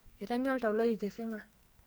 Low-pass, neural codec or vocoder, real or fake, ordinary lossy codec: none; codec, 44.1 kHz, 2.6 kbps, SNAC; fake; none